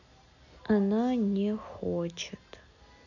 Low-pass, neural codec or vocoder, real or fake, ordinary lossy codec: 7.2 kHz; none; real; none